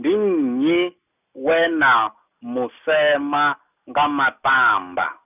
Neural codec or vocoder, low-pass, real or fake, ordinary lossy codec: none; 3.6 kHz; real; none